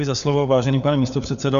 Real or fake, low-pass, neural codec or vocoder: fake; 7.2 kHz; codec, 16 kHz, 4 kbps, FunCodec, trained on LibriTTS, 50 frames a second